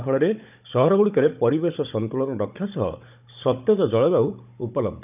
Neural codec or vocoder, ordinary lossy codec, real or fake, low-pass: codec, 16 kHz, 16 kbps, FunCodec, trained on Chinese and English, 50 frames a second; none; fake; 3.6 kHz